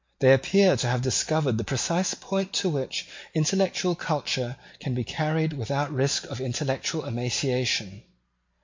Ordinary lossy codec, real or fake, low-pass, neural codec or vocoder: MP3, 48 kbps; real; 7.2 kHz; none